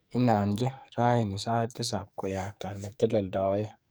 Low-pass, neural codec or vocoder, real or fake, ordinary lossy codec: none; codec, 44.1 kHz, 2.6 kbps, SNAC; fake; none